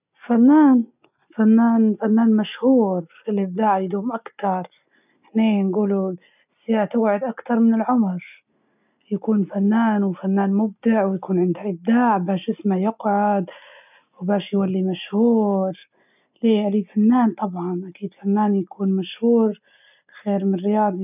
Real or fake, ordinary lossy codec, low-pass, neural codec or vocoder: real; none; 3.6 kHz; none